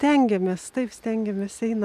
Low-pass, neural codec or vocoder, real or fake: 14.4 kHz; none; real